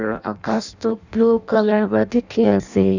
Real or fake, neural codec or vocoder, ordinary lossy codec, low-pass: fake; codec, 16 kHz in and 24 kHz out, 0.6 kbps, FireRedTTS-2 codec; none; 7.2 kHz